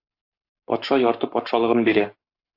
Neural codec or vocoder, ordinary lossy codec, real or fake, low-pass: codec, 44.1 kHz, 7.8 kbps, Pupu-Codec; AAC, 32 kbps; fake; 5.4 kHz